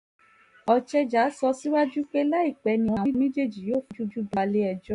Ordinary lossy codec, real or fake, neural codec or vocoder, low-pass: none; real; none; 10.8 kHz